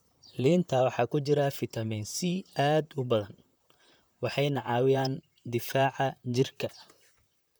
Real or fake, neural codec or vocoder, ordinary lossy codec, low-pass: fake; vocoder, 44.1 kHz, 128 mel bands, Pupu-Vocoder; none; none